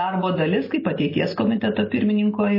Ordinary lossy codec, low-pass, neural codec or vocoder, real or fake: MP3, 24 kbps; 5.4 kHz; none; real